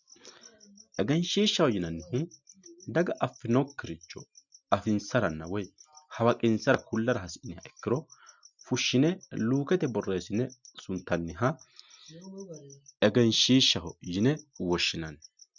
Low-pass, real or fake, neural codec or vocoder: 7.2 kHz; real; none